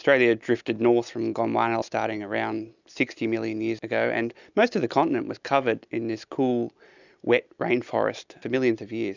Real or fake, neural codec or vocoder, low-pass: real; none; 7.2 kHz